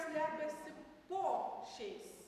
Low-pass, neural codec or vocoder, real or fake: 14.4 kHz; none; real